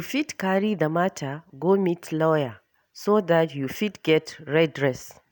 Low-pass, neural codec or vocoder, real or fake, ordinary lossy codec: none; none; real; none